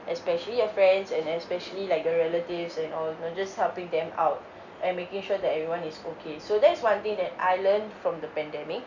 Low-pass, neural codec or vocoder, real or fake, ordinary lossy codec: 7.2 kHz; none; real; none